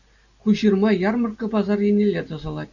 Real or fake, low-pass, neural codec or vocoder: real; 7.2 kHz; none